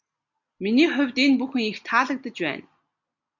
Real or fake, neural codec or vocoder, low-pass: real; none; 7.2 kHz